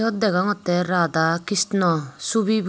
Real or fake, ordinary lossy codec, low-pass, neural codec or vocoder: real; none; none; none